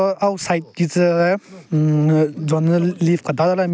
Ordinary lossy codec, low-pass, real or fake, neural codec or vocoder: none; none; real; none